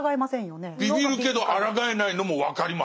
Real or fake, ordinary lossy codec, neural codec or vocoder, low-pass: real; none; none; none